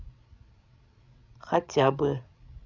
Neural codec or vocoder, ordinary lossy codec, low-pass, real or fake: codec, 16 kHz, 16 kbps, FreqCodec, larger model; none; 7.2 kHz; fake